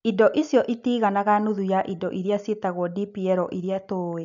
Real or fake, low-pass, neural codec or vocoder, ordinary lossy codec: real; 7.2 kHz; none; none